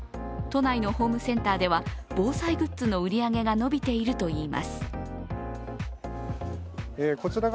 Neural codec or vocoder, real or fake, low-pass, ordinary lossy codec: none; real; none; none